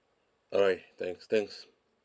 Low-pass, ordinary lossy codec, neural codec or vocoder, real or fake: none; none; none; real